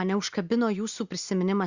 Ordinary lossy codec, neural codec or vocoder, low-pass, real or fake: Opus, 64 kbps; none; 7.2 kHz; real